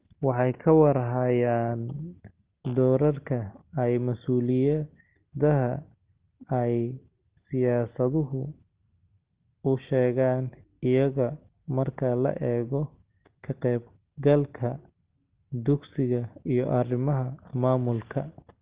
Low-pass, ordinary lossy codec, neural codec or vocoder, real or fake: 3.6 kHz; Opus, 16 kbps; none; real